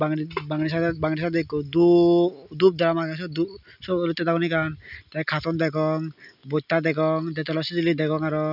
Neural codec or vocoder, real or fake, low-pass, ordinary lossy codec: none; real; 5.4 kHz; none